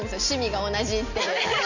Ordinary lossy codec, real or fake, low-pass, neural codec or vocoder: none; real; 7.2 kHz; none